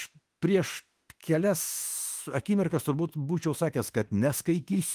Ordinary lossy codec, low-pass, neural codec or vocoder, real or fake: Opus, 24 kbps; 14.4 kHz; autoencoder, 48 kHz, 32 numbers a frame, DAC-VAE, trained on Japanese speech; fake